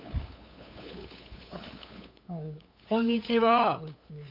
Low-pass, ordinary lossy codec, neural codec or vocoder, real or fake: 5.4 kHz; none; codec, 16 kHz, 8 kbps, FunCodec, trained on LibriTTS, 25 frames a second; fake